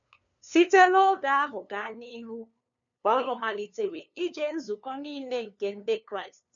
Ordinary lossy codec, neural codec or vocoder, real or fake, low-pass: none; codec, 16 kHz, 2 kbps, FunCodec, trained on LibriTTS, 25 frames a second; fake; 7.2 kHz